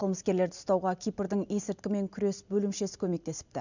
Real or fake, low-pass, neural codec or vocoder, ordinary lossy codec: real; 7.2 kHz; none; none